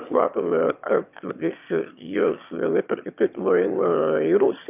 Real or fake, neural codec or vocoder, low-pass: fake; autoencoder, 22.05 kHz, a latent of 192 numbers a frame, VITS, trained on one speaker; 3.6 kHz